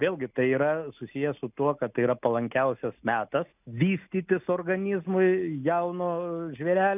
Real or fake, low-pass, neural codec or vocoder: real; 3.6 kHz; none